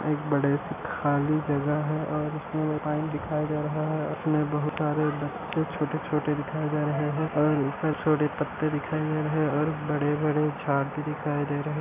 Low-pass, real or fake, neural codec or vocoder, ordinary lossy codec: 3.6 kHz; real; none; none